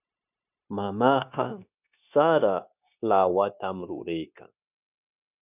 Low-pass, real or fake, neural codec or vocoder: 3.6 kHz; fake; codec, 16 kHz, 0.9 kbps, LongCat-Audio-Codec